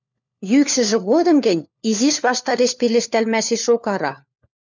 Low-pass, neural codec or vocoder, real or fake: 7.2 kHz; codec, 16 kHz, 4 kbps, FunCodec, trained on LibriTTS, 50 frames a second; fake